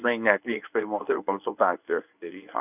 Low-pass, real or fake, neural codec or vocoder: 3.6 kHz; fake; codec, 24 kHz, 0.9 kbps, WavTokenizer, medium speech release version 1